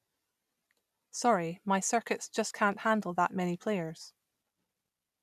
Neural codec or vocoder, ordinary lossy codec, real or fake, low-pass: none; none; real; 14.4 kHz